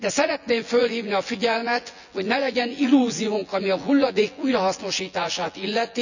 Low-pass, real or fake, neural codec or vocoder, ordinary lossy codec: 7.2 kHz; fake; vocoder, 24 kHz, 100 mel bands, Vocos; none